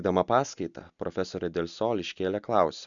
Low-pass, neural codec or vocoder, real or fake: 7.2 kHz; none; real